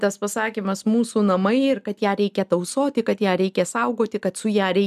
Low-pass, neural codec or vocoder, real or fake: 14.4 kHz; none; real